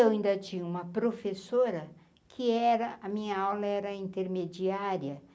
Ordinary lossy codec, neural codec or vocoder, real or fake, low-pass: none; none; real; none